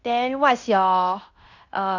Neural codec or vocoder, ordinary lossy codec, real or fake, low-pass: codec, 16 kHz in and 24 kHz out, 0.9 kbps, LongCat-Audio-Codec, fine tuned four codebook decoder; none; fake; 7.2 kHz